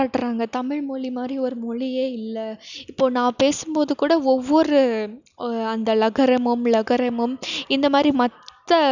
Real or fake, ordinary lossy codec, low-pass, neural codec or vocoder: real; none; 7.2 kHz; none